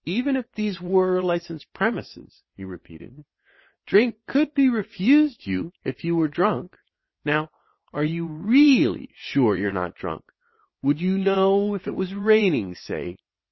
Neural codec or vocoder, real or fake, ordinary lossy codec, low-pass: vocoder, 22.05 kHz, 80 mel bands, WaveNeXt; fake; MP3, 24 kbps; 7.2 kHz